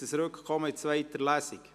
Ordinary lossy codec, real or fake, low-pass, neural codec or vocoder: none; real; 14.4 kHz; none